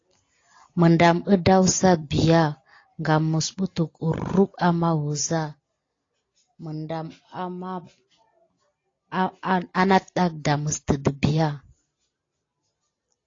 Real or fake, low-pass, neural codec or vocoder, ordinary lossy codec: real; 7.2 kHz; none; AAC, 32 kbps